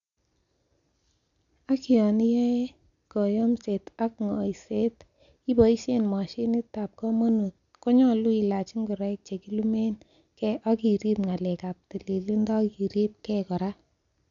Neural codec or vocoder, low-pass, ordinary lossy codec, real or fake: none; 7.2 kHz; none; real